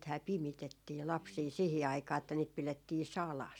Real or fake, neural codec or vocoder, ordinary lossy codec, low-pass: real; none; none; 19.8 kHz